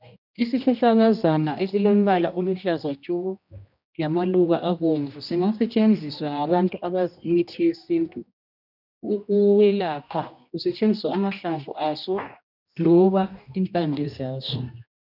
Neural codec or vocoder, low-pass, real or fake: codec, 16 kHz, 1 kbps, X-Codec, HuBERT features, trained on general audio; 5.4 kHz; fake